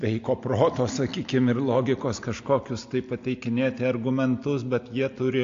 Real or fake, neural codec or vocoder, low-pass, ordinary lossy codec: real; none; 7.2 kHz; AAC, 48 kbps